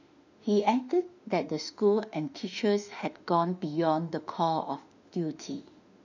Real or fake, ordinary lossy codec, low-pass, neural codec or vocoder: fake; none; 7.2 kHz; autoencoder, 48 kHz, 32 numbers a frame, DAC-VAE, trained on Japanese speech